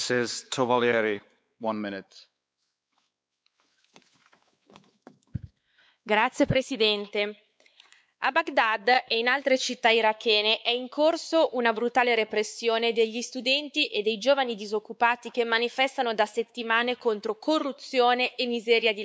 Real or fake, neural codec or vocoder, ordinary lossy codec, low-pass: fake; codec, 16 kHz, 4 kbps, X-Codec, WavLM features, trained on Multilingual LibriSpeech; none; none